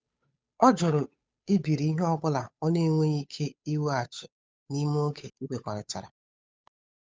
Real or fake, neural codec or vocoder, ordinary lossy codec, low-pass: fake; codec, 16 kHz, 8 kbps, FunCodec, trained on Chinese and English, 25 frames a second; none; none